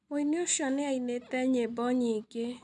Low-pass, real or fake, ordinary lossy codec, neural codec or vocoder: 10.8 kHz; real; none; none